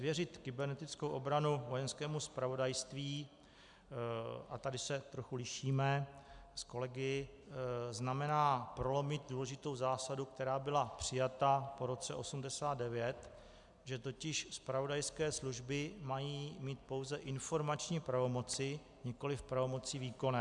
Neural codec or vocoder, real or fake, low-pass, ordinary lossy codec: none; real; 10.8 kHz; MP3, 96 kbps